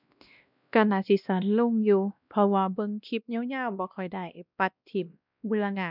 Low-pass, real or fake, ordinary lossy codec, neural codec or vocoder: 5.4 kHz; fake; none; codec, 16 kHz, 1 kbps, X-Codec, WavLM features, trained on Multilingual LibriSpeech